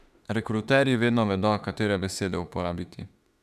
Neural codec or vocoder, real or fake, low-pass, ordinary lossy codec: autoencoder, 48 kHz, 32 numbers a frame, DAC-VAE, trained on Japanese speech; fake; 14.4 kHz; none